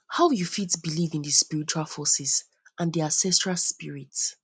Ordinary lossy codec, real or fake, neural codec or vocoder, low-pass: none; real; none; 9.9 kHz